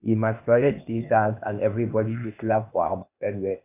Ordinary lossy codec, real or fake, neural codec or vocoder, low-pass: none; fake; codec, 16 kHz, 0.8 kbps, ZipCodec; 3.6 kHz